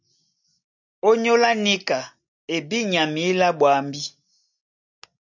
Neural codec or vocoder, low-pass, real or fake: none; 7.2 kHz; real